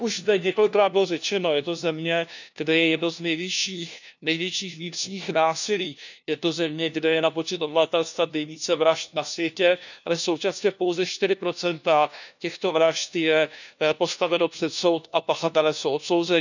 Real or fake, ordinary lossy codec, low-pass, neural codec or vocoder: fake; none; 7.2 kHz; codec, 16 kHz, 1 kbps, FunCodec, trained on LibriTTS, 50 frames a second